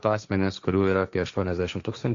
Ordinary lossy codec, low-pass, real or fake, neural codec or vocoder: Opus, 64 kbps; 7.2 kHz; fake; codec, 16 kHz, 1.1 kbps, Voila-Tokenizer